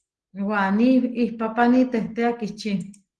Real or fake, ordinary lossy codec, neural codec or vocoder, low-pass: real; Opus, 16 kbps; none; 10.8 kHz